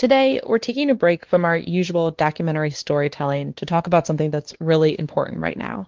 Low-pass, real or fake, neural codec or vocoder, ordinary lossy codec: 7.2 kHz; fake; codec, 16 kHz, 2 kbps, X-Codec, WavLM features, trained on Multilingual LibriSpeech; Opus, 16 kbps